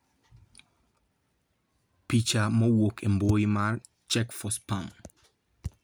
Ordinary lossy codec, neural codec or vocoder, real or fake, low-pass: none; none; real; none